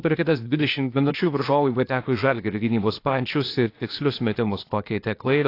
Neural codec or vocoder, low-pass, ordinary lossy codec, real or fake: codec, 16 kHz, 0.8 kbps, ZipCodec; 5.4 kHz; AAC, 32 kbps; fake